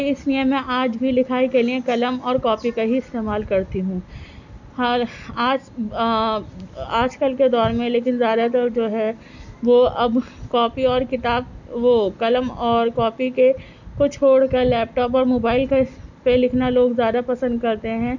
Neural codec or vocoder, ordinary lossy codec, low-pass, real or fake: none; none; 7.2 kHz; real